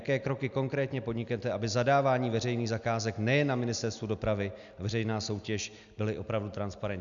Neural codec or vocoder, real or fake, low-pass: none; real; 7.2 kHz